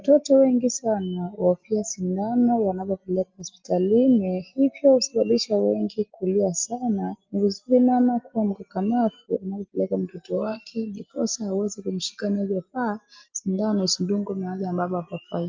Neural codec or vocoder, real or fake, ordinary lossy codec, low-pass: none; real; Opus, 32 kbps; 7.2 kHz